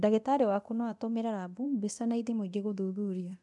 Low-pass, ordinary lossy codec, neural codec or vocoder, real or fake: 10.8 kHz; none; codec, 24 kHz, 0.9 kbps, DualCodec; fake